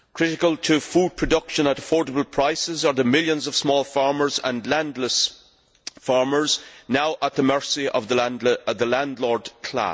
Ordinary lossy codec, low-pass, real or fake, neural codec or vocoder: none; none; real; none